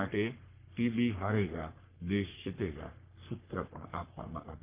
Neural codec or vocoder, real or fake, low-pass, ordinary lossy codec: codec, 44.1 kHz, 3.4 kbps, Pupu-Codec; fake; 3.6 kHz; Opus, 24 kbps